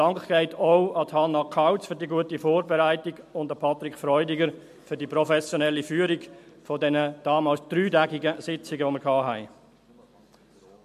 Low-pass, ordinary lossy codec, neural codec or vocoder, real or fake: 14.4 kHz; MP3, 64 kbps; none; real